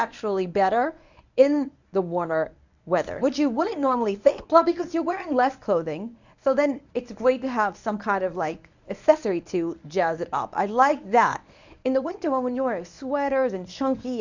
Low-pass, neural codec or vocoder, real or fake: 7.2 kHz; codec, 24 kHz, 0.9 kbps, WavTokenizer, medium speech release version 1; fake